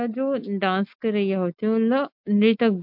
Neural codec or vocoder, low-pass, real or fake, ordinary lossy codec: none; 5.4 kHz; real; MP3, 48 kbps